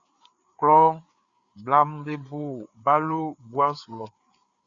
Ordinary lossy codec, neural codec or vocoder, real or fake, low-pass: Opus, 64 kbps; codec, 16 kHz, 4 kbps, FreqCodec, larger model; fake; 7.2 kHz